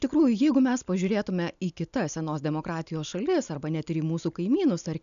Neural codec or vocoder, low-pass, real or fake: none; 7.2 kHz; real